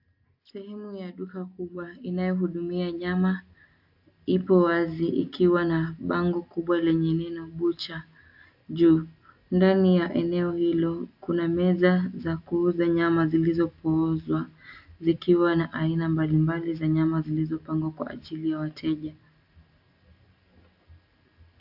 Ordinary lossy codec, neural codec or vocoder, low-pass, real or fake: AAC, 48 kbps; none; 5.4 kHz; real